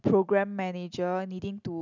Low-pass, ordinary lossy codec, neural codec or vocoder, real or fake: 7.2 kHz; none; none; real